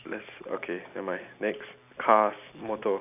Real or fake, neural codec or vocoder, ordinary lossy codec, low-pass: real; none; none; 3.6 kHz